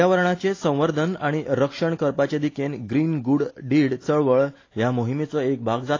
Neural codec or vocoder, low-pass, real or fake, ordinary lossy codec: none; 7.2 kHz; real; AAC, 32 kbps